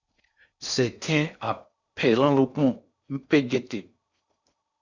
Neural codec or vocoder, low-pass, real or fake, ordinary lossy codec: codec, 16 kHz in and 24 kHz out, 0.6 kbps, FocalCodec, streaming, 4096 codes; 7.2 kHz; fake; Opus, 64 kbps